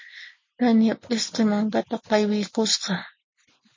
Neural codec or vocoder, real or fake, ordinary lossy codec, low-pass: none; real; MP3, 32 kbps; 7.2 kHz